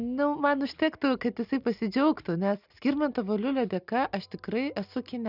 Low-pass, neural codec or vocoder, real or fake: 5.4 kHz; none; real